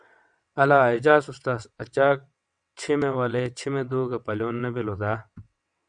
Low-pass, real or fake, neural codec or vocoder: 9.9 kHz; fake; vocoder, 22.05 kHz, 80 mel bands, WaveNeXt